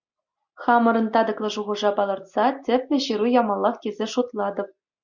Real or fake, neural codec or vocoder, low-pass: real; none; 7.2 kHz